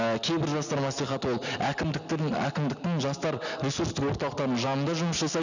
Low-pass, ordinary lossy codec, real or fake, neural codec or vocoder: 7.2 kHz; none; real; none